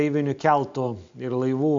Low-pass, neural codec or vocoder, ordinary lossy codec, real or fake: 7.2 kHz; none; AAC, 64 kbps; real